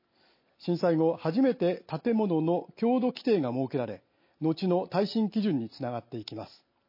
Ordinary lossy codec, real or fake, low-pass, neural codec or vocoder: MP3, 24 kbps; real; 5.4 kHz; none